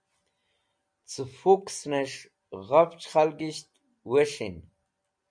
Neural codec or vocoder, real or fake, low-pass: none; real; 9.9 kHz